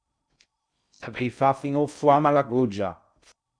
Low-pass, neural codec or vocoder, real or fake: 9.9 kHz; codec, 16 kHz in and 24 kHz out, 0.6 kbps, FocalCodec, streaming, 2048 codes; fake